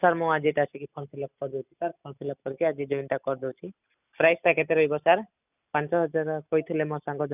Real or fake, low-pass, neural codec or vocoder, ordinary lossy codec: real; 3.6 kHz; none; none